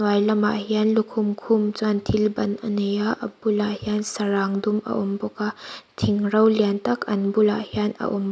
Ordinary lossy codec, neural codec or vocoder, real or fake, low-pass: none; none; real; none